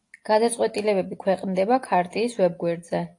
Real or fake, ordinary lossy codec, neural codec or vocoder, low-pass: real; AAC, 48 kbps; none; 10.8 kHz